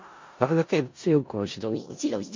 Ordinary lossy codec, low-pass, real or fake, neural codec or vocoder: MP3, 48 kbps; 7.2 kHz; fake; codec, 16 kHz in and 24 kHz out, 0.4 kbps, LongCat-Audio-Codec, four codebook decoder